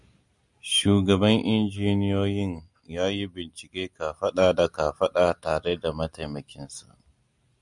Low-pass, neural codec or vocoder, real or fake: 10.8 kHz; none; real